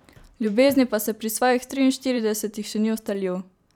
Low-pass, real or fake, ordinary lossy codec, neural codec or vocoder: 19.8 kHz; fake; none; vocoder, 44.1 kHz, 128 mel bands every 512 samples, BigVGAN v2